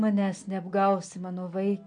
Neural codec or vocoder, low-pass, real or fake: none; 9.9 kHz; real